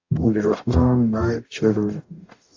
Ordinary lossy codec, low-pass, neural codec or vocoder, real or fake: AAC, 48 kbps; 7.2 kHz; codec, 44.1 kHz, 0.9 kbps, DAC; fake